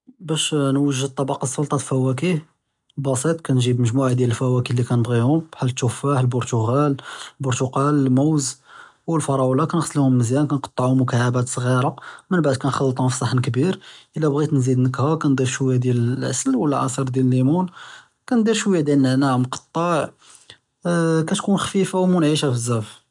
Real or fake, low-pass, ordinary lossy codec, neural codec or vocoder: real; 10.8 kHz; none; none